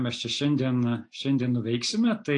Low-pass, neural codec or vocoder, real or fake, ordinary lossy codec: 9.9 kHz; none; real; AAC, 48 kbps